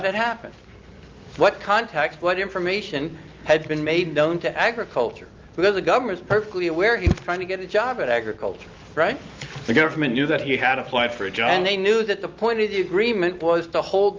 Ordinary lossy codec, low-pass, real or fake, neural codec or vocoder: Opus, 32 kbps; 7.2 kHz; real; none